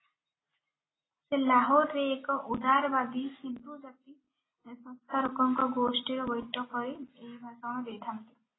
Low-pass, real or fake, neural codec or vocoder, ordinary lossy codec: 7.2 kHz; real; none; AAC, 16 kbps